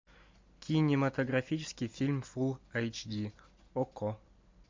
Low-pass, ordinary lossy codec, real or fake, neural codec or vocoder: 7.2 kHz; MP3, 64 kbps; real; none